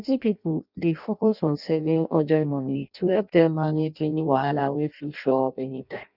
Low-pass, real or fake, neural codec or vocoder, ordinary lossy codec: 5.4 kHz; fake; codec, 16 kHz in and 24 kHz out, 0.6 kbps, FireRedTTS-2 codec; none